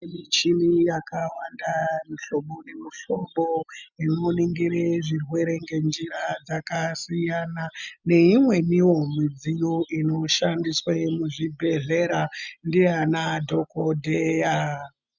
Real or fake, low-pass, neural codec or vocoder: real; 7.2 kHz; none